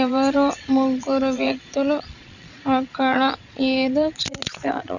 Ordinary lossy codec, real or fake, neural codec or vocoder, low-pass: none; fake; vocoder, 22.05 kHz, 80 mel bands, Vocos; 7.2 kHz